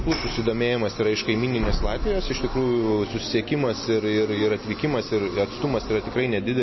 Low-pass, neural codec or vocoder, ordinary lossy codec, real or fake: 7.2 kHz; none; MP3, 24 kbps; real